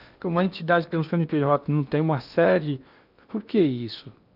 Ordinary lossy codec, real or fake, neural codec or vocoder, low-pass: none; fake; codec, 16 kHz in and 24 kHz out, 0.8 kbps, FocalCodec, streaming, 65536 codes; 5.4 kHz